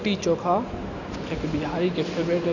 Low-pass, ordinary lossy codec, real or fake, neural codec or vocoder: 7.2 kHz; none; real; none